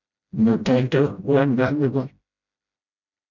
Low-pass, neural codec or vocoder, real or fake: 7.2 kHz; codec, 16 kHz, 0.5 kbps, FreqCodec, smaller model; fake